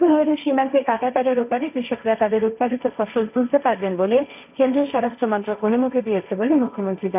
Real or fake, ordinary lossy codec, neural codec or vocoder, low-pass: fake; none; codec, 16 kHz, 1.1 kbps, Voila-Tokenizer; 3.6 kHz